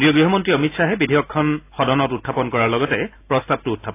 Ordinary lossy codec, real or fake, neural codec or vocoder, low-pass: AAC, 24 kbps; real; none; 3.6 kHz